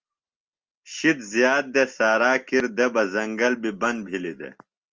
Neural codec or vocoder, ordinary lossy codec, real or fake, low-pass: none; Opus, 24 kbps; real; 7.2 kHz